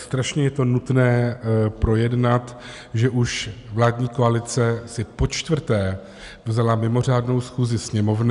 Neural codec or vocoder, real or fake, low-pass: none; real; 10.8 kHz